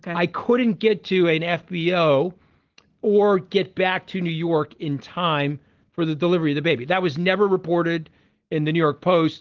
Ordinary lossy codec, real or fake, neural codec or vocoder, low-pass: Opus, 16 kbps; real; none; 7.2 kHz